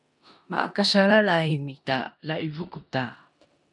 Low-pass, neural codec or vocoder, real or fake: 10.8 kHz; codec, 16 kHz in and 24 kHz out, 0.9 kbps, LongCat-Audio-Codec, four codebook decoder; fake